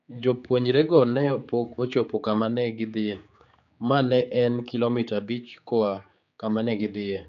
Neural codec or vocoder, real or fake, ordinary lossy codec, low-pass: codec, 16 kHz, 4 kbps, X-Codec, HuBERT features, trained on general audio; fake; none; 7.2 kHz